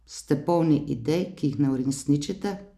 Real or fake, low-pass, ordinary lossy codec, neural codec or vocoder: real; 14.4 kHz; AAC, 96 kbps; none